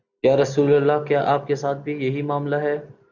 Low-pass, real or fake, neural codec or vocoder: 7.2 kHz; real; none